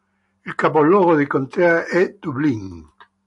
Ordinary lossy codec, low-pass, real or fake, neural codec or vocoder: AAC, 64 kbps; 10.8 kHz; real; none